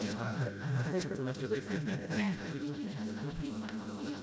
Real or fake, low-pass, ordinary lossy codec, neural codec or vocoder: fake; none; none; codec, 16 kHz, 0.5 kbps, FreqCodec, smaller model